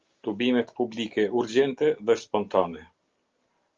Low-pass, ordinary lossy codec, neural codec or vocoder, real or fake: 7.2 kHz; Opus, 32 kbps; none; real